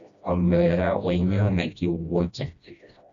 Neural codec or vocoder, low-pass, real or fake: codec, 16 kHz, 1 kbps, FreqCodec, smaller model; 7.2 kHz; fake